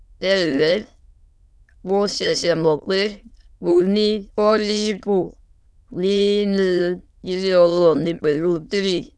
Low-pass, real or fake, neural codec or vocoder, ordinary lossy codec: none; fake; autoencoder, 22.05 kHz, a latent of 192 numbers a frame, VITS, trained on many speakers; none